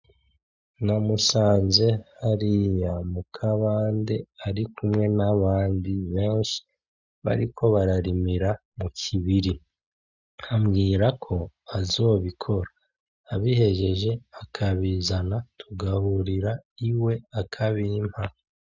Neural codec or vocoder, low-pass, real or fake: none; 7.2 kHz; real